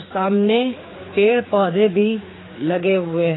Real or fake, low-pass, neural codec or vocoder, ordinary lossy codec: fake; 7.2 kHz; codec, 16 kHz, 4 kbps, X-Codec, HuBERT features, trained on general audio; AAC, 16 kbps